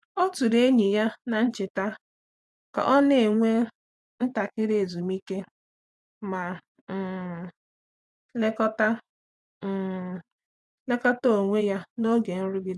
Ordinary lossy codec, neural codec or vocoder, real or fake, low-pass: none; vocoder, 24 kHz, 100 mel bands, Vocos; fake; none